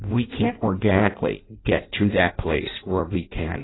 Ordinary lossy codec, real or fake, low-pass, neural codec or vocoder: AAC, 16 kbps; fake; 7.2 kHz; codec, 16 kHz in and 24 kHz out, 0.6 kbps, FireRedTTS-2 codec